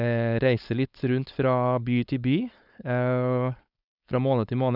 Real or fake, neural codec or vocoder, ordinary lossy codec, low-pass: fake; codec, 16 kHz, 4.8 kbps, FACodec; none; 5.4 kHz